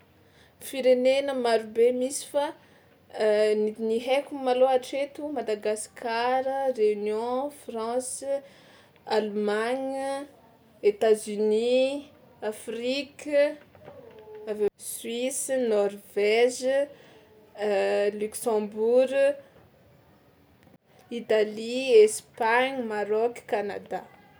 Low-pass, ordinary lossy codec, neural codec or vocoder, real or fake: none; none; none; real